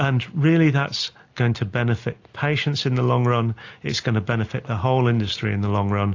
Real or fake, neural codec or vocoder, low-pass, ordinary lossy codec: real; none; 7.2 kHz; AAC, 48 kbps